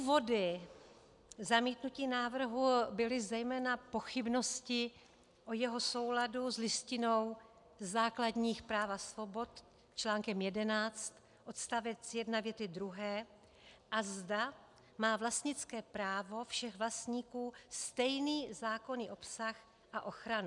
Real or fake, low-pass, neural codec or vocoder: real; 10.8 kHz; none